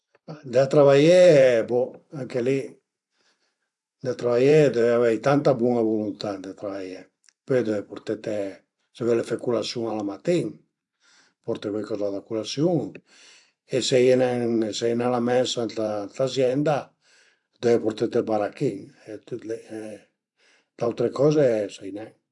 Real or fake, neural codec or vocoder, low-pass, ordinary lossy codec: fake; vocoder, 44.1 kHz, 128 mel bands every 512 samples, BigVGAN v2; 10.8 kHz; AAC, 64 kbps